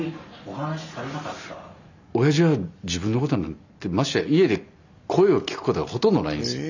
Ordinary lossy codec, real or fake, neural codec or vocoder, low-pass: none; real; none; 7.2 kHz